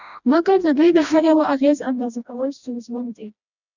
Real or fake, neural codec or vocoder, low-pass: fake; codec, 16 kHz, 1 kbps, FreqCodec, smaller model; 7.2 kHz